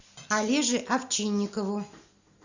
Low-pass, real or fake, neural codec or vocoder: 7.2 kHz; real; none